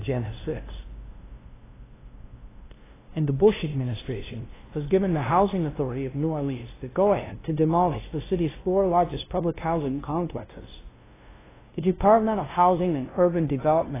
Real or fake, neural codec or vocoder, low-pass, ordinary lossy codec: fake; codec, 16 kHz, 0.5 kbps, FunCodec, trained on LibriTTS, 25 frames a second; 3.6 kHz; AAC, 16 kbps